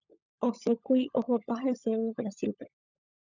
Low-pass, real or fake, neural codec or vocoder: 7.2 kHz; fake; codec, 16 kHz, 16 kbps, FunCodec, trained on LibriTTS, 50 frames a second